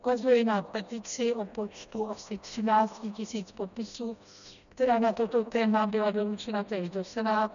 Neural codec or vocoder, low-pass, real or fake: codec, 16 kHz, 1 kbps, FreqCodec, smaller model; 7.2 kHz; fake